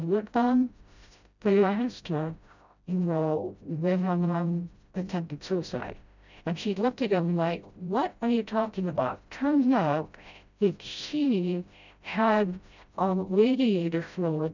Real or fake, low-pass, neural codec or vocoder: fake; 7.2 kHz; codec, 16 kHz, 0.5 kbps, FreqCodec, smaller model